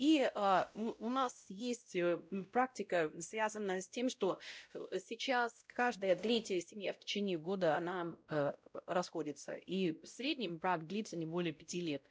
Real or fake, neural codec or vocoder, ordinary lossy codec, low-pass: fake; codec, 16 kHz, 0.5 kbps, X-Codec, WavLM features, trained on Multilingual LibriSpeech; none; none